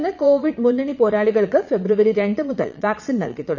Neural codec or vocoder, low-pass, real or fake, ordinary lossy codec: vocoder, 22.05 kHz, 80 mel bands, Vocos; 7.2 kHz; fake; none